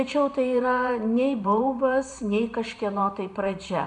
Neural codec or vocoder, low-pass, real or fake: vocoder, 44.1 kHz, 128 mel bands every 512 samples, BigVGAN v2; 10.8 kHz; fake